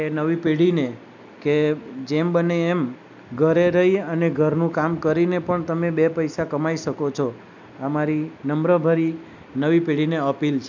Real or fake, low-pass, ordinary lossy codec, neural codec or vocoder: real; 7.2 kHz; none; none